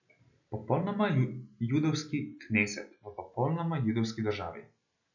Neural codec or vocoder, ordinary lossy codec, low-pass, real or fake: none; none; 7.2 kHz; real